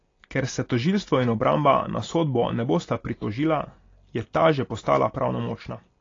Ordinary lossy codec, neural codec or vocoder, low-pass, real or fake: AAC, 32 kbps; none; 7.2 kHz; real